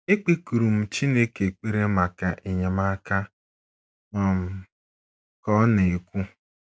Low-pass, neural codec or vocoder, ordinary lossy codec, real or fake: none; none; none; real